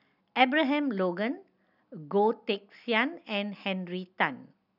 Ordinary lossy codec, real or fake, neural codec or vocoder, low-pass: none; real; none; 5.4 kHz